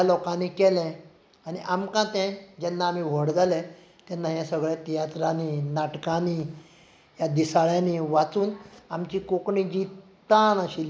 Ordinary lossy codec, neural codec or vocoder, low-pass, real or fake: none; none; none; real